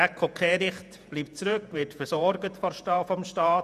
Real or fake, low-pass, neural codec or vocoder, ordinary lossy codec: fake; 14.4 kHz; vocoder, 48 kHz, 128 mel bands, Vocos; none